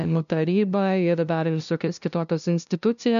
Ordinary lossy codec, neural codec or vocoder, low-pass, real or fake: MP3, 96 kbps; codec, 16 kHz, 0.5 kbps, FunCodec, trained on LibriTTS, 25 frames a second; 7.2 kHz; fake